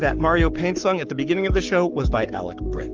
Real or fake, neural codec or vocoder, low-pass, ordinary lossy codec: fake; codec, 44.1 kHz, 7.8 kbps, Pupu-Codec; 7.2 kHz; Opus, 24 kbps